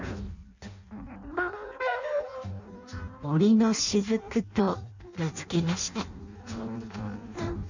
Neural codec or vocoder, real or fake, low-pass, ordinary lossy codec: codec, 16 kHz in and 24 kHz out, 0.6 kbps, FireRedTTS-2 codec; fake; 7.2 kHz; none